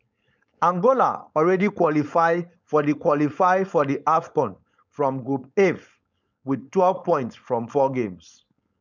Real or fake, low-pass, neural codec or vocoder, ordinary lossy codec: fake; 7.2 kHz; codec, 16 kHz, 4.8 kbps, FACodec; none